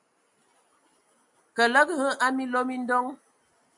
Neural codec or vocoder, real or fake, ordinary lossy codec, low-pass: none; real; MP3, 64 kbps; 10.8 kHz